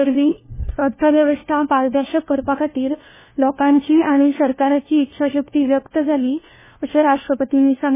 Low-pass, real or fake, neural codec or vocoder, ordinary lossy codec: 3.6 kHz; fake; codec, 16 kHz, 1 kbps, FunCodec, trained on LibriTTS, 50 frames a second; MP3, 16 kbps